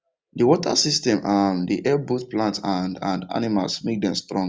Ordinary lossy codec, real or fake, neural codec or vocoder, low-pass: none; real; none; none